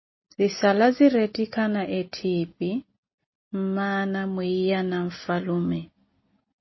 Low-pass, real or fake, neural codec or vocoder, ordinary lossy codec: 7.2 kHz; real; none; MP3, 24 kbps